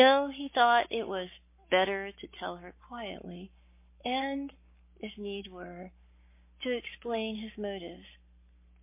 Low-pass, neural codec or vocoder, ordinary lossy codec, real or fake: 3.6 kHz; codec, 44.1 kHz, 7.8 kbps, Pupu-Codec; MP3, 24 kbps; fake